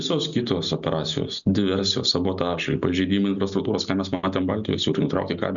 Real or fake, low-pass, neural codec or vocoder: real; 7.2 kHz; none